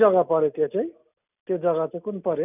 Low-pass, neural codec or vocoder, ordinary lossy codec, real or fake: 3.6 kHz; none; none; real